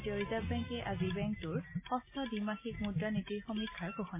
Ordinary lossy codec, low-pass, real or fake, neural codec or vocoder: MP3, 24 kbps; 3.6 kHz; real; none